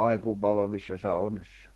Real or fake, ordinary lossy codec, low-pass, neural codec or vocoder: fake; Opus, 16 kbps; 14.4 kHz; codec, 32 kHz, 1.9 kbps, SNAC